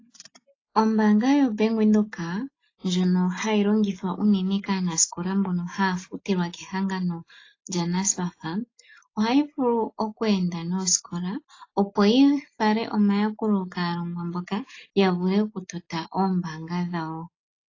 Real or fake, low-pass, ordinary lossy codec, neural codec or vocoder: real; 7.2 kHz; AAC, 32 kbps; none